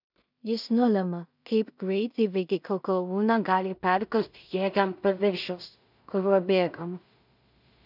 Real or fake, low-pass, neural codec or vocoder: fake; 5.4 kHz; codec, 16 kHz in and 24 kHz out, 0.4 kbps, LongCat-Audio-Codec, two codebook decoder